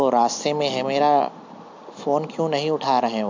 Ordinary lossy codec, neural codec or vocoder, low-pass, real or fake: MP3, 64 kbps; none; 7.2 kHz; real